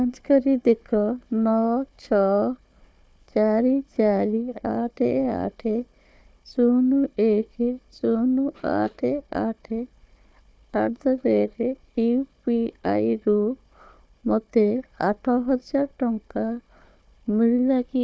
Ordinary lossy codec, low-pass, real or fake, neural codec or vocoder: none; none; fake; codec, 16 kHz, 4 kbps, FunCodec, trained on Chinese and English, 50 frames a second